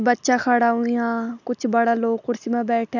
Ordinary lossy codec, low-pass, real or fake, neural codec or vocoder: none; 7.2 kHz; real; none